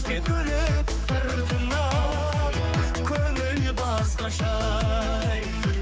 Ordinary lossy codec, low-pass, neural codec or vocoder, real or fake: none; none; codec, 16 kHz, 4 kbps, X-Codec, HuBERT features, trained on general audio; fake